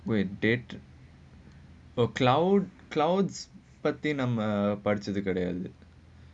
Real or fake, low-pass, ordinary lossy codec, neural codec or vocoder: real; 9.9 kHz; none; none